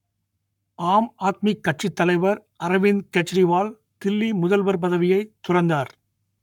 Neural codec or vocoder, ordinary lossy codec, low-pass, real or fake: codec, 44.1 kHz, 7.8 kbps, Pupu-Codec; none; 19.8 kHz; fake